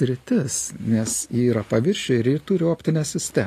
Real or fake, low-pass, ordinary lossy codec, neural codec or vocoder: real; 14.4 kHz; AAC, 48 kbps; none